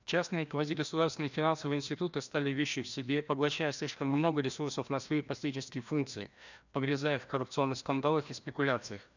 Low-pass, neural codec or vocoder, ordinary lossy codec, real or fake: 7.2 kHz; codec, 16 kHz, 1 kbps, FreqCodec, larger model; none; fake